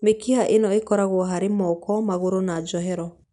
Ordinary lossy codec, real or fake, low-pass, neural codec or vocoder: none; real; 10.8 kHz; none